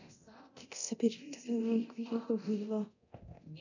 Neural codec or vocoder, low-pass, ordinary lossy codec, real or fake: codec, 24 kHz, 0.9 kbps, DualCodec; 7.2 kHz; none; fake